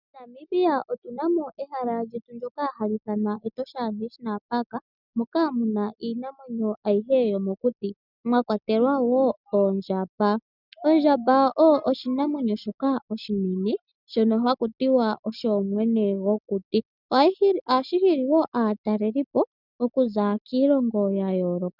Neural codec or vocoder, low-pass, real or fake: none; 5.4 kHz; real